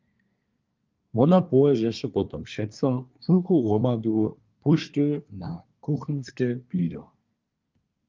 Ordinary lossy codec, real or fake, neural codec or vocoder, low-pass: Opus, 24 kbps; fake; codec, 24 kHz, 1 kbps, SNAC; 7.2 kHz